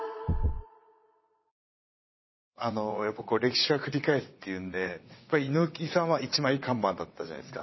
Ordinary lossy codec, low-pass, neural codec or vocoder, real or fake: MP3, 24 kbps; 7.2 kHz; vocoder, 22.05 kHz, 80 mel bands, WaveNeXt; fake